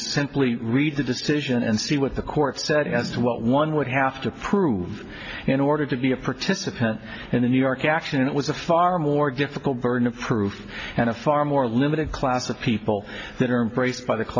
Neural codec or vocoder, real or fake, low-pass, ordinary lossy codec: none; real; 7.2 kHz; AAC, 48 kbps